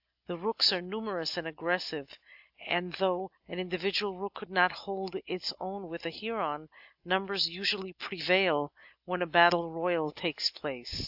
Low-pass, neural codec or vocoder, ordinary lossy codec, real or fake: 5.4 kHz; none; AAC, 48 kbps; real